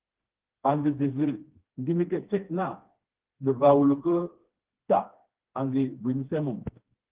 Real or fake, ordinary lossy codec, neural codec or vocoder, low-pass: fake; Opus, 16 kbps; codec, 16 kHz, 2 kbps, FreqCodec, smaller model; 3.6 kHz